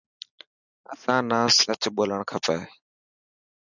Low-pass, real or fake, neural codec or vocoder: 7.2 kHz; real; none